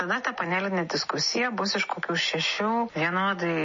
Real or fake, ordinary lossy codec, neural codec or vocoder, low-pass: real; MP3, 32 kbps; none; 7.2 kHz